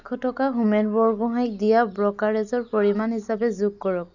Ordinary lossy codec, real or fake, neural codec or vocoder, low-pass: none; real; none; 7.2 kHz